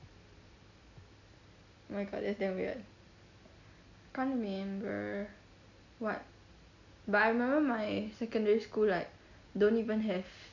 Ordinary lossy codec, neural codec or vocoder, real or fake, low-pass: none; none; real; 7.2 kHz